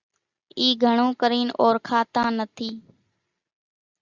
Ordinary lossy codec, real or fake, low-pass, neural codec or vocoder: Opus, 64 kbps; real; 7.2 kHz; none